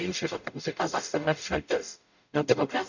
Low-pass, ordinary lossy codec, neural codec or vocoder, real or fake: 7.2 kHz; none; codec, 44.1 kHz, 0.9 kbps, DAC; fake